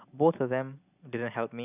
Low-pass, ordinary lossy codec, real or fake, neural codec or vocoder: 3.6 kHz; none; real; none